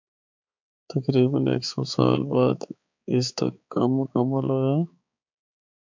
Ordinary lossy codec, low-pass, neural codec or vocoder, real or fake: MP3, 64 kbps; 7.2 kHz; codec, 16 kHz, 4 kbps, X-Codec, HuBERT features, trained on balanced general audio; fake